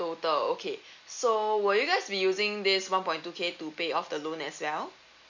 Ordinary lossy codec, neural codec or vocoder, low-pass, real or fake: none; none; 7.2 kHz; real